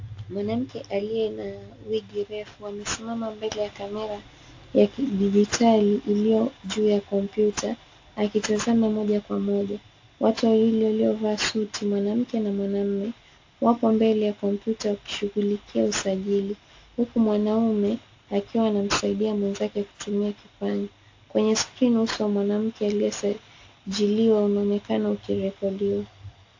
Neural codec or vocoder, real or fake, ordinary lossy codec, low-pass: none; real; AAC, 48 kbps; 7.2 kHz